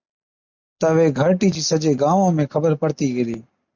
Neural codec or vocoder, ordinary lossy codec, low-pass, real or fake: none; MP3, 64 kbps; 7.2 kHz; real